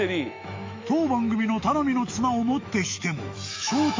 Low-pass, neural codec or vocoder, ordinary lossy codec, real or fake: 7.2 kHz; autoencoder, 48 kHz, 128 numbers a frame, DAC-VAE, trained on Japanese speech; MP3, 48 kbps; fake